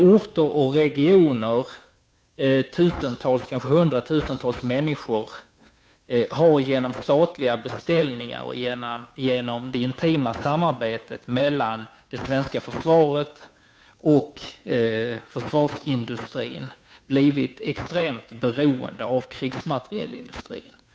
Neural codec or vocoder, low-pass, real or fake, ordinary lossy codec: codec, 16 kHz, 2 kbps, FunCodec, trained on Chinese and English, 25 frames a second; none; fake; none